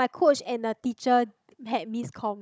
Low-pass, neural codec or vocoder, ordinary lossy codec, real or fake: none; codec, 16 kHz, 16 kbps, FunCodec, trained on Chinese and English, 50 frames a second; none; fake